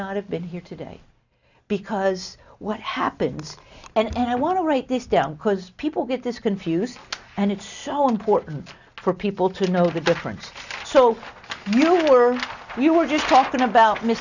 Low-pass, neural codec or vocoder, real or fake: 7.2 kHz; none; real